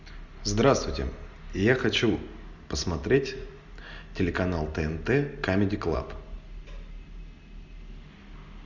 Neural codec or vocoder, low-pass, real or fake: none; 7.2 kHz; real